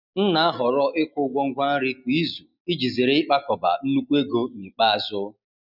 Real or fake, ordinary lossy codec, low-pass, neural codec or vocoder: real; none; 5.4 kHz; none